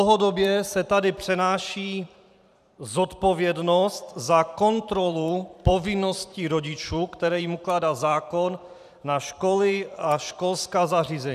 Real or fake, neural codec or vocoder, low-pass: fake; vocoder, 44.1 kHz, 128 mel bands every 512 samples, BigVGAN v2; 14.4 kHz